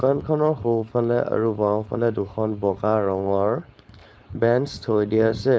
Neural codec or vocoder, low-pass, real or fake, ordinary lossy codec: codec, 16 kHz, 4.8 kbps, FACodec; none; fake; none